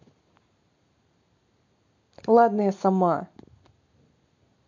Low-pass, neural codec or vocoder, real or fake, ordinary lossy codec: 7.2 kHz; none; real; MP3, 48 kbps